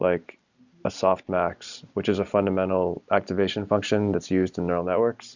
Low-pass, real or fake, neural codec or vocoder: 7.2 kHz; real; none